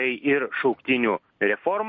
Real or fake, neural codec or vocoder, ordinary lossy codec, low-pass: real; none; MP3, 32 kbps; 7.2 kHz